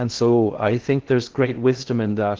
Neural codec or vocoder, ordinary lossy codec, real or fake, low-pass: codec, 16 kHz in and 24 kHz out, 0.6 kbps, FocalCodec, streaming, 2048 codes; Opus, 16 kbps; fake; 7.2 kHz